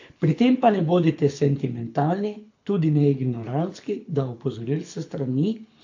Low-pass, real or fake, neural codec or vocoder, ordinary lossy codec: 7.2 kHz; fake; codec, 24 kHz, 6 kbps, HILCodec; AAC, 48 kbps